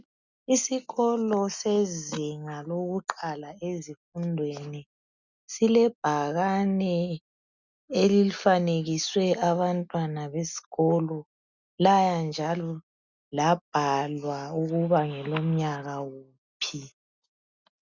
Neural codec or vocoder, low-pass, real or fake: none; 7.2 kHz; real